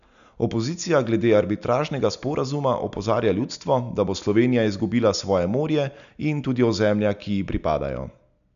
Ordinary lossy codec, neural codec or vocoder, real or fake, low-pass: none; none; real; 7.2 kHz